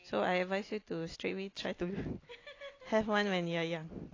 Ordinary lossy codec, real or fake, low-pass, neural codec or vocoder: AAC, 32 kbps; real; 7.2 kHz; none